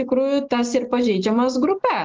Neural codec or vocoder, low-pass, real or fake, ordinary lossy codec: none; 7.2 kHz; real; Opus, 24 kbps